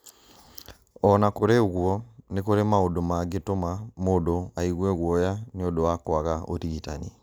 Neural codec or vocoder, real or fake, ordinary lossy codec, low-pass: none; real; none; none